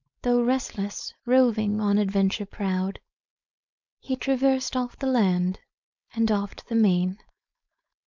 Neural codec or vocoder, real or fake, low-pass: codec, 16 kHz, 4.8 kbps, FACodec; fake; 7.2 kHz